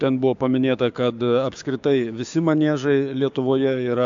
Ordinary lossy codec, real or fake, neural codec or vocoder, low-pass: AAC, 64 kbps; fake; codec, 16 kHz, 6 kbps, DAC; 7.2 kHz